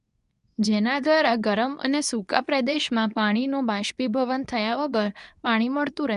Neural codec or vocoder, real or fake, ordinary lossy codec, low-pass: codec, 24 kHz, 0.9 kbps, WavTokenizer, medium speech release version 2; fake; none; 10.8 kHz